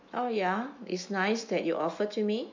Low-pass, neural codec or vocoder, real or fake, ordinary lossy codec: 7.2 kHz; autoencoder, 48 kHz, 128 numbers a frame, DAC-VAE, trained on Japanese speech; fake; MP3, 48 kbps